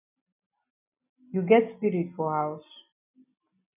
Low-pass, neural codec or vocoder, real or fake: 3.6 kHz; none; real